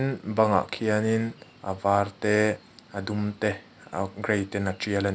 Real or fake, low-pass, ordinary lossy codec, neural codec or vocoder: real; none; none; none